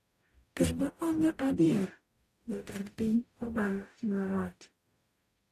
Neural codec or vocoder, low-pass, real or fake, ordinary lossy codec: codec, 44.1 kHz, 0.9 kbps, DAC; 14.4 kHz; fake; AAC, 64 kbps